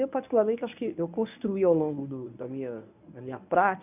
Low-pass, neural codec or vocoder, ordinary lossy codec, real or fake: 3.6 kHz; codec, 24 kHz, 0.9 kbps, WavTokenizer, medium speech release version 1; none; fake